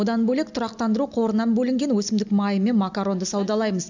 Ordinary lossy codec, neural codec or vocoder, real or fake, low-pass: none; none; real; 7.2 kHz